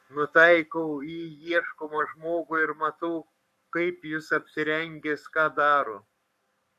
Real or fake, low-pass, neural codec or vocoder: fake; 14.4 kHz; codec, 44.1 kHz, 7.8 kbps, Pupu-Codec